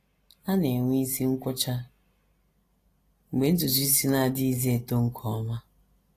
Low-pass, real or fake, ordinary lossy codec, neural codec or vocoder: 14.4 kHz; real; AAC, 48 kbps; none